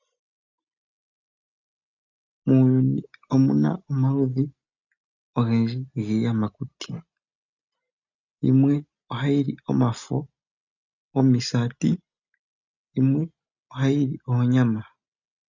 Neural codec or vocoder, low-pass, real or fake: none; 7.2 kHz; real